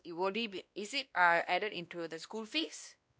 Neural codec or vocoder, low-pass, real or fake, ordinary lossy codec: codec, 16 kHz, 1 kbps, X-Codec, WavLM features, trained on Multilingual LibriSpeech; none; fake; none